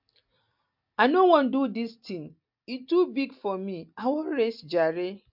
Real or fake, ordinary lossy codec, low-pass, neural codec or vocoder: real; MP3, 48 kbps; 5.4 kHz; none